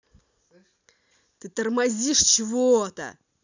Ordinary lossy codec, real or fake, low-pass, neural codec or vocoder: none; real; 7.2 kHz; none